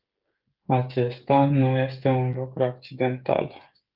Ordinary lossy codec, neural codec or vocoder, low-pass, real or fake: Opus, 32 kbps; codec, 16 kHz, 16 kbps, FreqCodec, smaller model; 5.4 kHz; fake